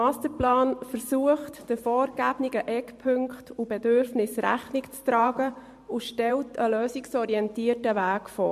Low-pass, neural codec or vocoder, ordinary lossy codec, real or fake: 14.4 kHz; vocoder, 44.1 kHz, 128 mel bands every 512 samples, BigVGAN v2; MP3, 64 kbps; fake